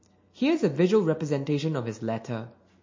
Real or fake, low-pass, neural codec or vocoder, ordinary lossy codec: real; 7.2 kHz; none; MP3, 32 kbps